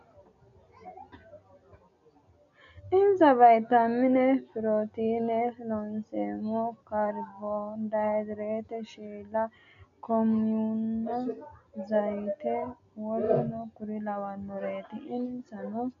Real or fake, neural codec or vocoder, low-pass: real; none; 7.2 kHz